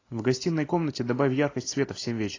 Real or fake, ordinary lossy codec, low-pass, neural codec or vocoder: real; AAC, 32 kbps; 7.2 kHz; none